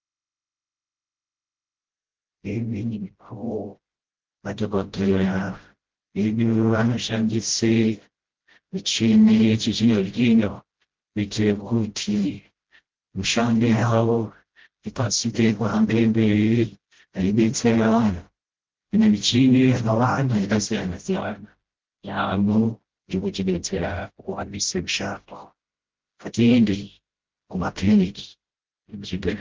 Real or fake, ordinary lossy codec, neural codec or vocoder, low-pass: fake; Opus, 16 kbps; codec, 16 kHz, 0.5 kbps, FreqCodec, smaller model; 7.2 kHz